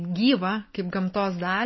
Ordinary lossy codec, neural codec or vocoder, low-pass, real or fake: MP3, 24 kbps; none; 7.2 kHz; real